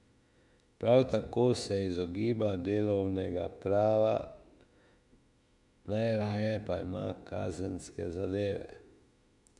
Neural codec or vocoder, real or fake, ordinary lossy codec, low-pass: autoencoder, 48 kHz, 32 numbers a frame, DAC-VAE, trained on Japanese speech; fake; none; 10.8 kHz